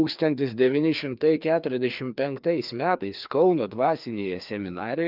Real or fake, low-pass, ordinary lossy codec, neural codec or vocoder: fake; 5.4 kHz; Opus, 24 kbps; codec, 16 kHz, 2 kbps, FreqCodec, larger model